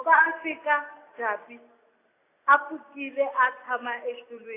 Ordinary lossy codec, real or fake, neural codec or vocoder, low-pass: AAC, 24 kbps; real; none; 3.6 kHz